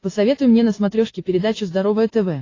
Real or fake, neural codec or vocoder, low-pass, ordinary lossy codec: real; none; 7.2 kHz; AAC, 32 kbps